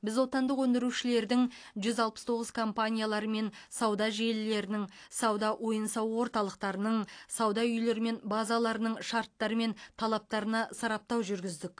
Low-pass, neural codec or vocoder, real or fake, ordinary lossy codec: 9.9 kHz; none; real; AAC, 48 kbps